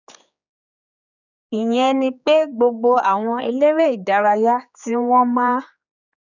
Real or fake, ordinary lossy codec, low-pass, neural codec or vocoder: fake; none; 7.2 kHz; codec, 16 kHz, 4 kbps, X-Codec, HuBERT features, trained on general audio